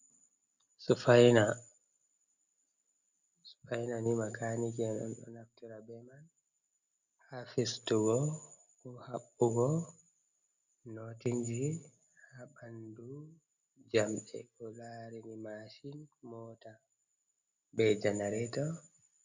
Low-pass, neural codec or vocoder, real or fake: 7.2 kHz; none; real